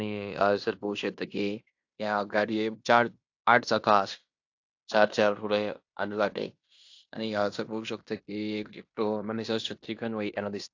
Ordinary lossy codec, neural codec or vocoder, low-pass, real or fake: AAC, 48 kbps; codec, 16 kHz in and 24 kHz out, 0.9 kbps, LongCat-Audio-Codec, fine tuned four codebook decoder; 7.2 kHz; fake